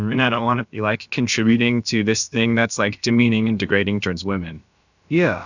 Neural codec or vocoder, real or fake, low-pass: codec, 16 kHz, about 1 kbps, DyCAST, with the encoder's durations; fake; 7.2 kHz